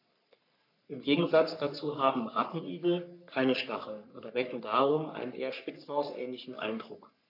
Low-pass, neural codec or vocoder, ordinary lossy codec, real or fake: 5.4 kHz; codec, 44.1 kHz, 3.4 kbps, Pupu-Codec; none; fake